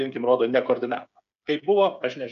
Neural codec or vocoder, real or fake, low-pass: codec, 16 kHz, 8 kbps, FreqCodec, smaller model; fake; 7.2 kHz